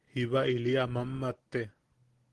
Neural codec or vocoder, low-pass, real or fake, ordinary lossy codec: none; 10.8 kHz; real; Opus, 16 kbps